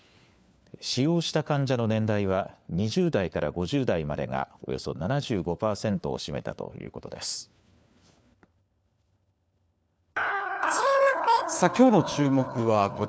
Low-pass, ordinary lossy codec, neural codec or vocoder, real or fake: none; none; codec, 16 kHz, 4 kbps, FunCodec, trained on LibriTTS, 50 frames a second; fake